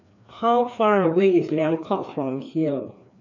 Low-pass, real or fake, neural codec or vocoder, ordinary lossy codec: 7.2 kHz; fake; codec, 16 kHz, 2 kbps, FreqCodec, larger model; none